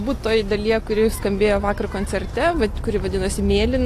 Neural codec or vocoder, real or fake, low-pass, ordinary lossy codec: none; real; 14.4 kHz; AAC, 48 kbps